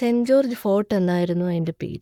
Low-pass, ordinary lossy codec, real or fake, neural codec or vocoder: 19.8 kHz; none; fake; codec, 44.1 kHz, 7.8 kbps, Pupu-Codec